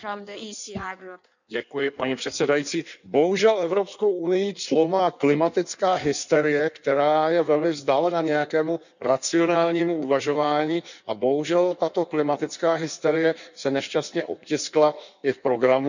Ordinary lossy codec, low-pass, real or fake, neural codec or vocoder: none; 7.2 kHz; fake; codec, 16 kHz in and 24 kHz out, 1.1 kbps, FireRedTTS-2 codec